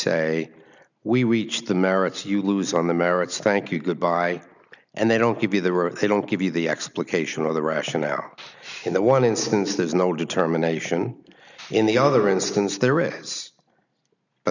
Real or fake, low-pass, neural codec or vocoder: real; 7.2 kHz; none